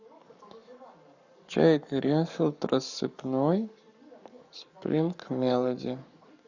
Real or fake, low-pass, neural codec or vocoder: fake; 7.2 kHz; codec, 44.1 kHz, 7.8 kbps, Pupu-Codec